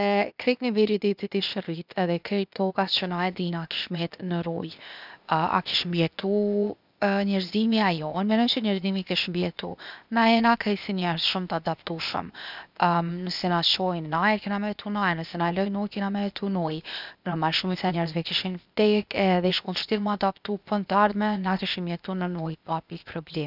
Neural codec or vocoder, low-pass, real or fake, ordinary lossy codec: codec, 16 kHz, 0.8 kbps, ZipCodec; 5.4 kHz; fake; none